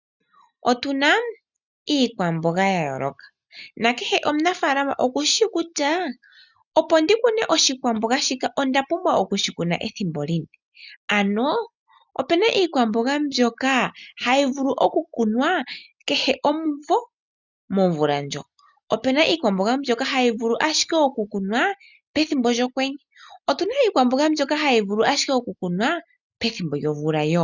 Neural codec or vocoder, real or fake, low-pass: none; real; 7.2 kHz